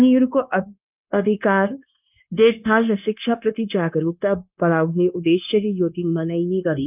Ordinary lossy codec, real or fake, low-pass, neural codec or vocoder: none; fake; 3.6 kHz; codec, 16 kHz, 0.9 kbps, LongCat-Audio-Codec